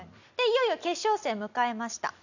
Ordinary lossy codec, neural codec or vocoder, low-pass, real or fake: none; none; 7.2 kHz; real